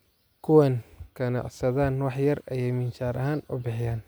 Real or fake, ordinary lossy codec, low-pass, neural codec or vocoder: real; none; none; none